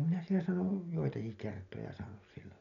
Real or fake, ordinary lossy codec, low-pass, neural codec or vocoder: real; none; 7.2 kHz; none